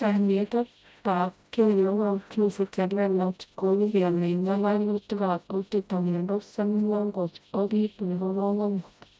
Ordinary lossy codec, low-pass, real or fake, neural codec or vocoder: none; none; fake; codec, 16 kHz, 0.5 kbps, FreqCodec, smaller model